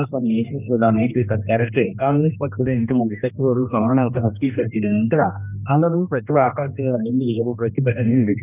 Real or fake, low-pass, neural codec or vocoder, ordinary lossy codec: fake; 3.6 kHz; codec, 16 kHz, 1 kbps, X-Codec, HuBERT features, trained on general audio; none